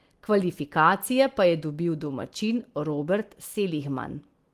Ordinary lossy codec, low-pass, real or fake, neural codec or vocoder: Opus, 24 kbps; 14.4 kHz; real; none